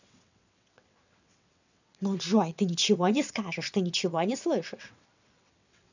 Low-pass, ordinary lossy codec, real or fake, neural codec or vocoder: 7.2 kHz; none; fake; vocoder, 22.05 kHz, 80 mel bands, WaveNeXt